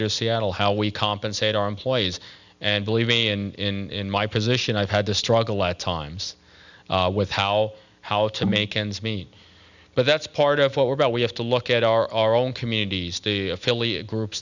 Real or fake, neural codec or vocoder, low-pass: real; none; 7.2 kHz